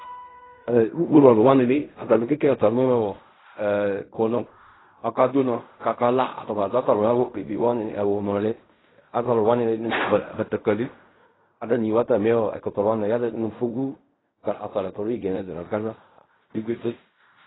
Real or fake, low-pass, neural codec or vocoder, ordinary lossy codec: fake; 7.2 kHz; codec, 16 kHz in and 24 kHz out, 0.4 kbps, LongCat-Audio-Codec, fine tuned four codebook decoder; AAC, 16 kbps